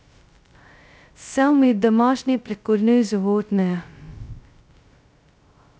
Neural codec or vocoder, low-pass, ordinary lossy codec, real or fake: codec, 16 kHz, 0.2 kbps, FocalCodec; none; none; fake